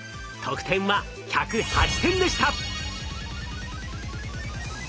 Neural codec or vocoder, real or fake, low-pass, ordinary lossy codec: none; real; none; none